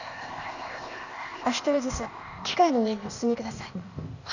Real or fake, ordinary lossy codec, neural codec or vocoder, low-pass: fake; none; codec, 16 kHz, 0.8 kbps, ZipCodec; 7.2 kHz